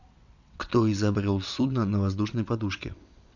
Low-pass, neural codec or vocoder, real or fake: 7.2 kHz; vocoder, 22.05 kHz, 80 mel bands, WaveNeXt; fake